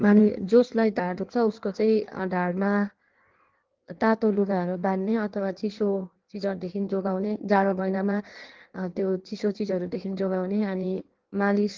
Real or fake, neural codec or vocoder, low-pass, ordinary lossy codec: fake; codec, 16 kHz in and 24 kHz out, 1.1 kbps, FireRedTTS-2 codec; 7.2 kHz; Opus, 16 kbps